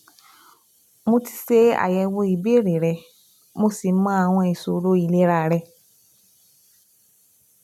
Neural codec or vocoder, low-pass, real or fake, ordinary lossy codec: none; 19.8 kHz; real; none